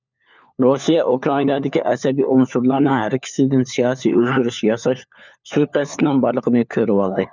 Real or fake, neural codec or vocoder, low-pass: fake; codec, 16 kHz, 4 kbps, FunCodec, trained on LibriTTS, 50 frames a second; 7.2 kHz